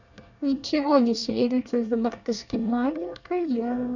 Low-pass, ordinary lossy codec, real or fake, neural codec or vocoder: 7.2 kHz; none; fake; codec, 24 kHz, 1 kbps, SNAC